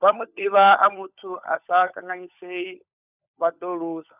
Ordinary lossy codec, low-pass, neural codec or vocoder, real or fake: none; 3.6 kHz; codec, 16 kHz, 16 kbps, FunCodec, trained on LibriTTS, 50 frames a second; fake